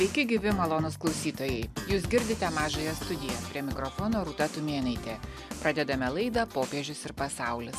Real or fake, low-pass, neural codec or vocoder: real; 14.4 kHz; none